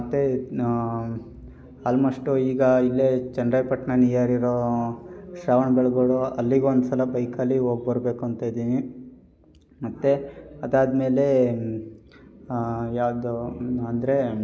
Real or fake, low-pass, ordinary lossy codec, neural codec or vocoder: real; none; none; none